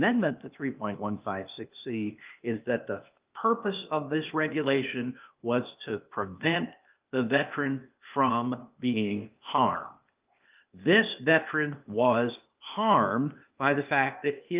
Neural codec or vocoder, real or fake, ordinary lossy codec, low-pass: codec, 16 kHz, 0.8 kbps, ZipCodec; fake; Opus, 24 kbps; 3.6 kHz